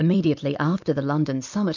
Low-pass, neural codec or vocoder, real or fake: 7.2 kHz; vocoder, 44.1 kHz, 128 mel bands every 256 samples, BigVGAN v2; fake